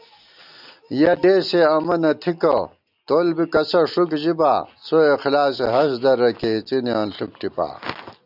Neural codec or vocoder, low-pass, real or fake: none; 5.4 kHz; real